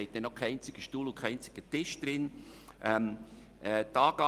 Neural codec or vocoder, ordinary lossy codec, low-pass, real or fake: none; Opus, 24 kbps; 14.4 kHz; real